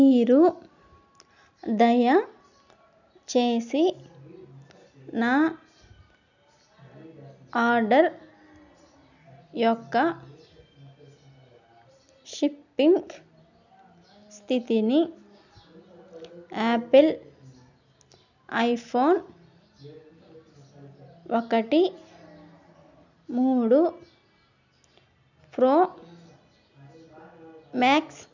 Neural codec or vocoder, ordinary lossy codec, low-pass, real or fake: none; none; 7.2 kHz; real